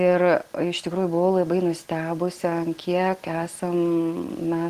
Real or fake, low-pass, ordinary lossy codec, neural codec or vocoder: real; 14.4 kHz; Opus, 16 kbps; none